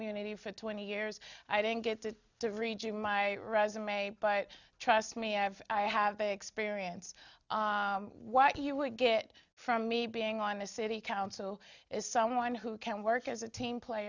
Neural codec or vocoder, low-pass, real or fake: none; 7.2 kHz; real